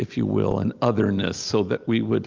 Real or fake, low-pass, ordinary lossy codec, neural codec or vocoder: real; 7.2 kHz; Opus, 24 kbps; none